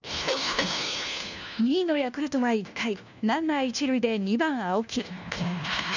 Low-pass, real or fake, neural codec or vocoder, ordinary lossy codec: 7.2 kHz; fake; codec, 16 kHz, 1 kbps, FunCodec, trained on LibriTTS, 50 frames a second; none